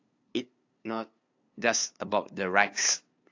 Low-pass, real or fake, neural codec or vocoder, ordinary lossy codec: 7.2 kHz; fake; codec, 16 kHz, 2 kbps, FunCodec, trained on LibriTTS, 25 frames a second; AAC, 48 kbps